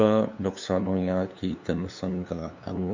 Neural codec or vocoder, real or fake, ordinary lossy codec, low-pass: codec, 16 kHz, 2 kbps, FunCodec, trained on LibriTTS, 25 frames a second; fake; MP3, 64 kbps; 7.2 kHz